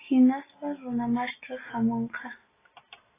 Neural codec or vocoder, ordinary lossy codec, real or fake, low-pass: none; AAC, 16 kbps; real; 3.6 kHz